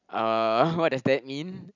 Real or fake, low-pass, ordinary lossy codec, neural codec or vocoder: real; 7.2 kHz; none; none